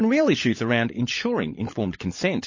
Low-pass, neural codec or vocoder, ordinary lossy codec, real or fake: 7.2 kHz; codec, 16 kHz, 16 kbps, FunCodec, trained on LibriTTS, 50 frames a second; MP3, 32 kbps; fake